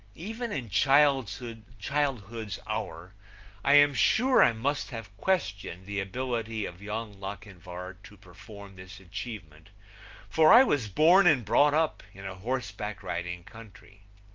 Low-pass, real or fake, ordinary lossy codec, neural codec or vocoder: 7.2 kHz; real; Opus, 24 kbps; none